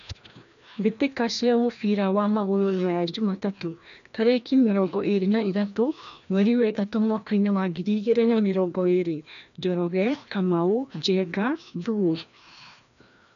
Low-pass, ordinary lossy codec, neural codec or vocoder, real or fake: 7.2 kHz; none; codec, 16 kHz, 1 kbps, FreqCodec, larger model; fake